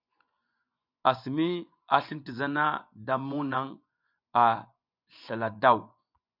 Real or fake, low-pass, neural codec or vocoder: fake; 5.4 kHz; vocoder, 24 kHz, 100 mel bands, Vocos